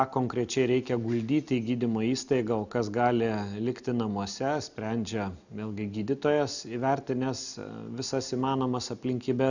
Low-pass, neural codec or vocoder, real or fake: 7.2 kHz; none; real